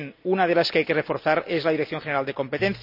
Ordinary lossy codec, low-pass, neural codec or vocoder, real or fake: none; 5.4 kHz; none; real